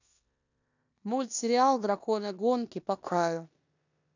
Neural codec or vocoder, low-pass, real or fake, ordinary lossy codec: codec, 16 kHz in and 24 kHz out, 0.9 kbps, LongCat-Audio-Codec, four codebook decoder; 7.2 kHz; fake; none